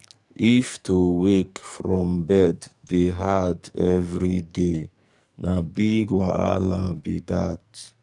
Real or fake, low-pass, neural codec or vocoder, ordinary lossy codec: fake; 10.8 kHz; codec, 44.1 kHz, 2.6 kbps, SNAC; none